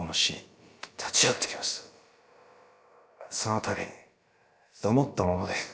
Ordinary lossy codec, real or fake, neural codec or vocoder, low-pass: none; fake; codec, 16 kHz, about 1 kbps, DyCAST, with the encoder's durations; none